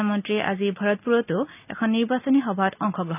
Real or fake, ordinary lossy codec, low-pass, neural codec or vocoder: real; none; 3.6 kHz; none